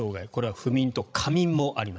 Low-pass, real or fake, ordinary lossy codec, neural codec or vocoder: none; fake; none; codec, 16 kHz, 16 kbps, FreqCodec, larger model